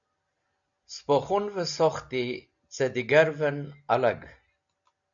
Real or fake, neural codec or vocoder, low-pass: real; none; 7.2 kHz